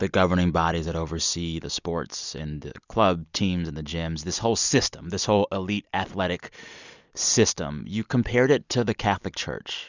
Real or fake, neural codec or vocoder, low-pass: real; none; 7.2 kHz